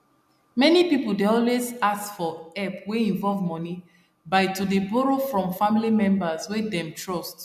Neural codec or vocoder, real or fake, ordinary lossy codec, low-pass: none; real; none; 14.4 kHz